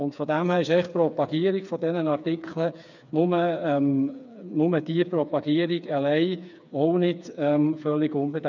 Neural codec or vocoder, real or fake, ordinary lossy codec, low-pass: codec, 16 kHz, 4 kbps, FreqCodec, smaller model; fake; none; 7.2 kHz